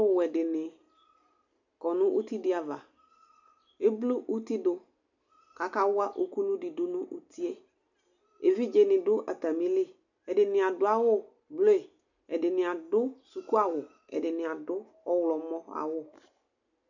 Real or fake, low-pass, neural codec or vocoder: real; 7.2 kHz; none